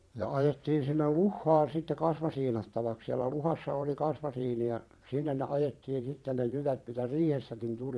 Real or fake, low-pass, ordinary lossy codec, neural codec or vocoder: fake; none; none; vocoder, 22.05 kHz, 80 mel bands, Vocos